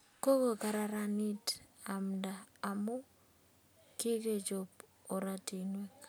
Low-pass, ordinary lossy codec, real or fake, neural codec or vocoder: none; none; real; none